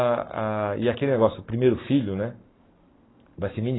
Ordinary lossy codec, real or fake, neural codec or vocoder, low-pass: AAC, 16 kbps; fake; codec, 44.1 kHz, 7.8 kbps, Pupu-Codec; 7.2 kHz